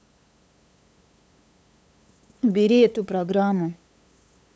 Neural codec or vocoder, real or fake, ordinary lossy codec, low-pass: codec, 16 kHz, 8 kbps, FunCodec, trained on LibriTTS, 25 frames a second; fake; none; none